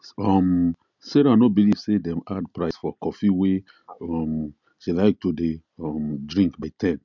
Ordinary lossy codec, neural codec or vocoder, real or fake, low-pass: none; none; real; 7.2 kHz